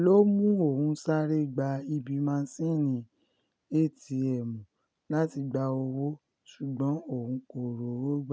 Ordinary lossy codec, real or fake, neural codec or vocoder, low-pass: none; real; none; none